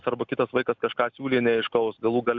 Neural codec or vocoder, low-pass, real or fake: none; 7.2 kHz; real